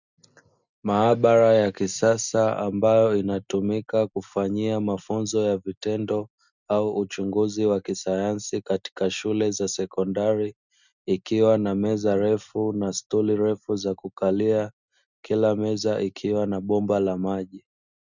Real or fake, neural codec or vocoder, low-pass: real; none; 7.2 kHz